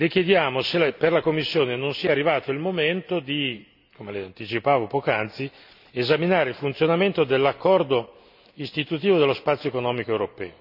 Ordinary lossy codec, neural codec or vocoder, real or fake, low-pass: none; none; real; 5.4 kHz